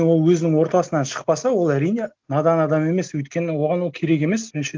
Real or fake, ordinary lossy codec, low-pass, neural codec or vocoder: real; Opus, 24 kbps; 7.2 kHz; none